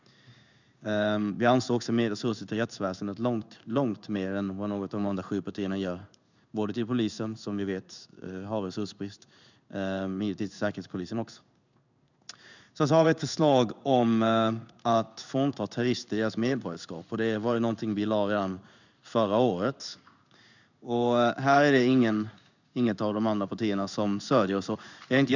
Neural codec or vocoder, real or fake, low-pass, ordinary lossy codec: codec, 16 kHz in and 24 kHz out, 1 kbps, XY-Tokenizer; fake; 7.2 kHz; none